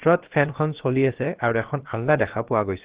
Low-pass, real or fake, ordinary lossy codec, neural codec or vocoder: 3.6 kHz; fake; Opus, 16 kbps; codec, 16 kHz, about 1 kbps, DyCAST, with the encoder's durations